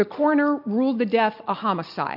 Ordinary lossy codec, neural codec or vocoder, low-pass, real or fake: MP3, 48 kbps; none; 5.4 kHz; real